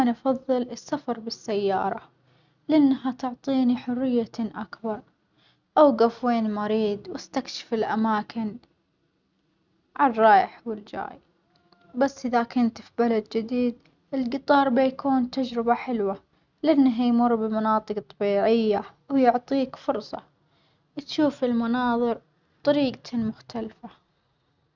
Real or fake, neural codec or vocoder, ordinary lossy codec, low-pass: real; none; none; 7.2 kHz